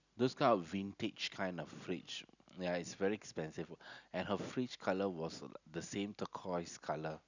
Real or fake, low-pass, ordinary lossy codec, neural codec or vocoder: real; 7.2 kHz; none; none